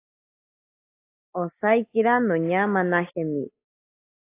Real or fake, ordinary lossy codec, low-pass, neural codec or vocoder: real; AAC, 24 kbps; 3.6 kHz; none